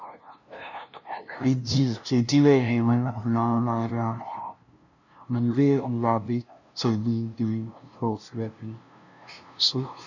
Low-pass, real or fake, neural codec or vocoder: 7.2 kHz; fake; codec, 16 kHz, 0.5 kbps, FunCodec, trained on LibriTTS, 25 frames a second